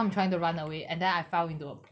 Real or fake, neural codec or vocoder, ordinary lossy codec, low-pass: real; none; none; none